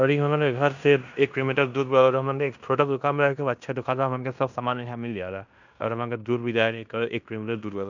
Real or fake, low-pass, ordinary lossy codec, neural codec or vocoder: fake; 7.2 kHz; none; codec, 16 kHz in and 24 kHz out, 0.9 kbps, LongCat-Audio-Codec, fine tuned four codebook decoder